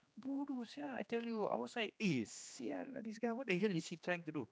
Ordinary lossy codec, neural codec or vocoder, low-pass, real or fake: none; codec, 16 kHz, 2 kbps, X-Codec, HuBERT features, trained on general audio; none; fake